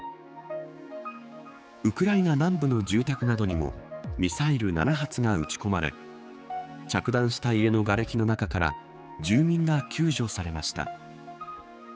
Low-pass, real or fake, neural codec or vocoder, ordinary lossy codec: none; fake; codec, 16 kHz, 4 kbps, X-Codec, HuBERT features, trained on general audio; none